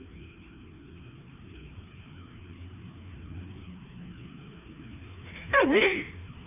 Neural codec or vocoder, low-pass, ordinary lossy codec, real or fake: codec, 16 kHz, 2 kbps, FreqCodec, larger model; 3.6 kHz; none; fake